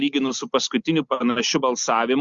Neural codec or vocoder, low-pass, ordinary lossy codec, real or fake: none; 7.2 kHz; Opus, 64 kbps; real